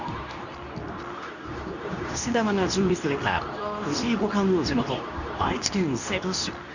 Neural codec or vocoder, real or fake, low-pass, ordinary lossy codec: codec, 24 kHz, 0.9 kbps, WavTokenizer, medium speech release version 2; fake; 7.2 kHz; none